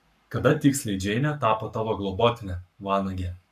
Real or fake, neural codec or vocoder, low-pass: fake; codec, 44.1 kHz, 7.8 kbps, Pupu-Codec; 14.4 kHz